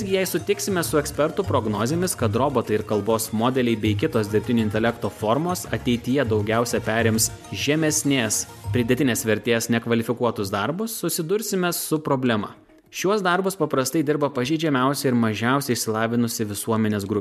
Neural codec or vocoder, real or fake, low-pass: none; real; 14.4 kHz